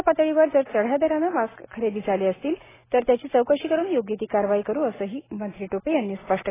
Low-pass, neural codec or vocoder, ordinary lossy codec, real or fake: 3.6 kHz; none; AAC, 16 kbps; real